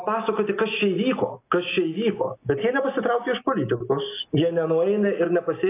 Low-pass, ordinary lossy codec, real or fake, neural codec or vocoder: 3.6 kHz; AAC, 24 kbps; real; none